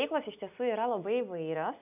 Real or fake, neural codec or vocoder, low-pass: real; none; 3.6 kHz